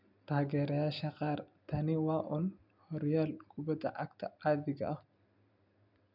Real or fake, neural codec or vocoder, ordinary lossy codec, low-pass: real; none; none; 5.4 kHz